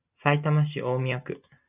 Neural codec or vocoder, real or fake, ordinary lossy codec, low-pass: none; real; AAC, 32 kbps; 3.6 kHz